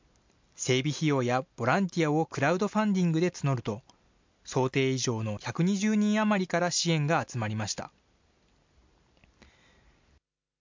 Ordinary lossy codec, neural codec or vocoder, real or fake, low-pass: MP3, 64 kbps; none; real; 7.2 kHz